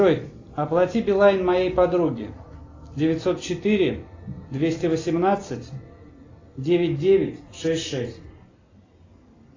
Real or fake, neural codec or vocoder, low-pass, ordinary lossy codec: real; none; 7.2 kHz; AAC, 32 kbps